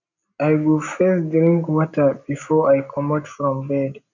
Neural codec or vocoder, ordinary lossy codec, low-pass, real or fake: none; none; 7.2 kHz; real